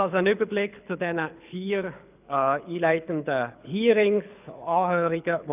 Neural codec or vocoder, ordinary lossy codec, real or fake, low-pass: codec, 24 kHz, 6 kbps, HILCodec; none; fake; 3.6 kHz